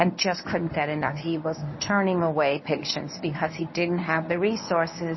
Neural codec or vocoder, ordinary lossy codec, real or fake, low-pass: codec, 24 kHz, 0.9 kbps, WavTokenizer, medium speech release version 1; MP3, 24 kbps; fake; 7.2 kHz